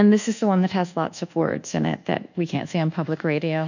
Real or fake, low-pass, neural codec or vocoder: fake; 7.2 kHz; codec, 24 kHz, 1.2 kbps, DualCodec